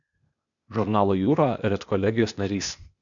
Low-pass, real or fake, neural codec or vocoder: 7.2 kHz; fake; codec, 16 kHz, 0.8 kbps, ZipCodec